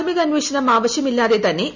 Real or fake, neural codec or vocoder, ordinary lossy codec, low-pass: real; none; none; 7.2 kHz